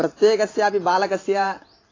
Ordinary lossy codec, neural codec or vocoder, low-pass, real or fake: AAC, 32 kbps; vocoder, 44.1 kHz, 128 mel bands every 512 samples, BigVGAN v2; 7.2 kHz; fake